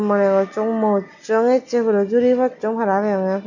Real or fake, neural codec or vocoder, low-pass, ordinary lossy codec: real; none; 7.2 kHz; none